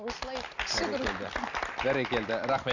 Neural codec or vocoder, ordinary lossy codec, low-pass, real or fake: none; none; 7.2 kHz; real